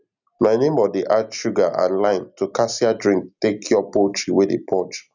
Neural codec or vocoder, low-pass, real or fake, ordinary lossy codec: none; 7.2 kHz; real; none